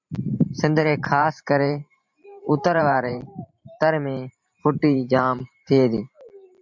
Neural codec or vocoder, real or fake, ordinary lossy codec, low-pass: vocoder, 44.1 kHz, 128 mel bands every 512 samples, BigVGAN v2; fake; MP3, 64 kbps; 7.2 kHz